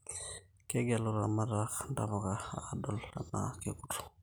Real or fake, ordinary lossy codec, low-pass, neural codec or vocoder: real; none; none; none